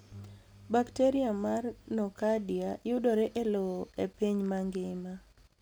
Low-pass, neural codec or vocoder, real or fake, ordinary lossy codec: none; none; real; none